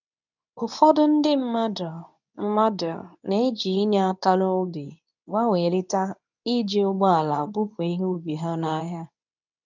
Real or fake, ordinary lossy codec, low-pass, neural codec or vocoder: fake; none; 7.2 kHz; codec, 24 kHz, 0.9 kbps, WavTokenizer, medium speech release version 2